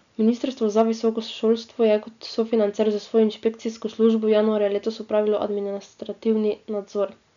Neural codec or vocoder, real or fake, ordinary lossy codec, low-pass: none; real; none; 7.2 kHz